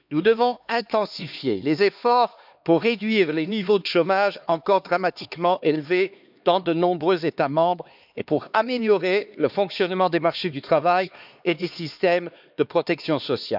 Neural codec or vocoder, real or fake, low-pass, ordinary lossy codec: codec, 16 kHz, 2 kbps, X-Codec, HuBERT features, trained on LibriSpeech; fake; 5.4 kHz; none